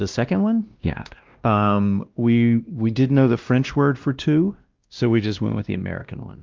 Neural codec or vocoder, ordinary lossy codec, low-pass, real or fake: codec, 16 kHz, 1 kbps, X-Codec, WavLM features, trained on Multilingual LibriSpeech; Opus, 24 kbps; 7.2 kHz; fake